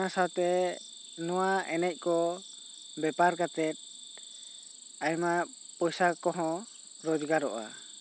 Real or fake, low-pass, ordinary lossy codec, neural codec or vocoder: real; none; none; none